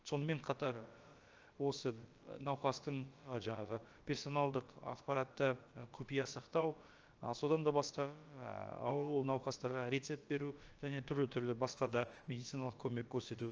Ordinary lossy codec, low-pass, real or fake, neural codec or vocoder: Opus, 24 kbps; 7.2 kHz; fake; codec, 16 kHz, about 1 kbps, DyCAST, with the encoder's durations